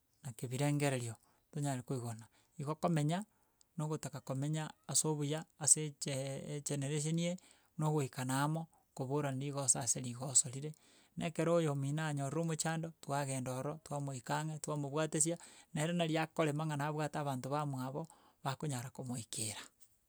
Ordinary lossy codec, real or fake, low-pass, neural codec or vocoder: none; real; none; none